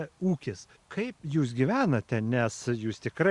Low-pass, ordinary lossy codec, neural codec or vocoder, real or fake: 10.8 kHz; Opus, 64 kbps; none; real